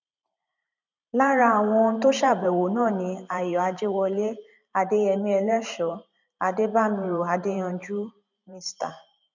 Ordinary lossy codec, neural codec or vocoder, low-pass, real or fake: none; vocoder, 44.1 kHz, 128 mel bands every 512 samples, BigVGAN v2; 7.2 kHz; fake